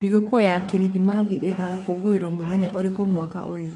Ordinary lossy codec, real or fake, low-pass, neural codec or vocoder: none; fake; 10.8 kHz; codec, 24 kHz, 1 kbps, SNAC